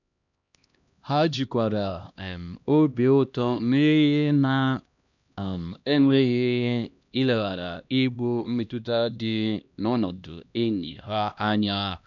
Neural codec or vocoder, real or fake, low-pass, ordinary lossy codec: codec, 16 kHz, 1 kbps, X-Codec, HuBERT features, trained on LibriSpeech; fake; 7.2 kHz; none